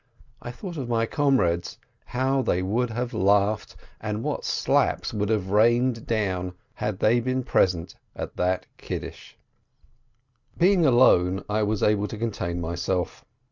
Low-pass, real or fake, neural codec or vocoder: 7.2 kHz; real; none